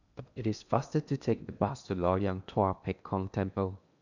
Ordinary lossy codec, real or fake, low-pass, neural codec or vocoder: none; fake; 7.2 kHz; codec, 16 kHz in and 24 kHz out, 0.8 kbps, FocalCodec, streaming, 65536 codes